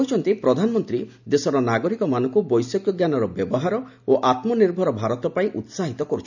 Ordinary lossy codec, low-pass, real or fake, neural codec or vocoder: none; 7.2 kHz; real; none